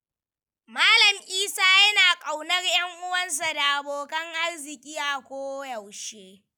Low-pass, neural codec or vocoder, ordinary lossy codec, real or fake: none; none; none; real